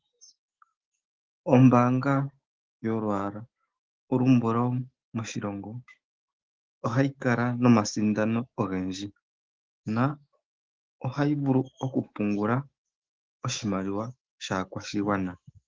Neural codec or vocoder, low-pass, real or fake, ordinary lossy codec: autoencoder, 48 kHz, 128 numbers a frame, DAC-VAE, trained on Japanese speech; 7.2 kHz; fake; Opus, 16 kbps